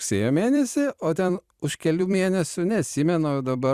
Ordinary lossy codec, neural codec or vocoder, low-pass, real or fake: Opus, 64 kbps; vocoder, 44.1 kHz, 128 mel bands every 256 samples, BigVGAN v2; 14.4 kHz; fake